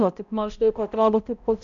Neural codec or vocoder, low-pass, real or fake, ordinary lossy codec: codec, 16 kHz, 0.5 kbps, X-Codec, HuBERT features, trained on balanced general audio; 7.2 kHz; fake; Opus, 64 kbps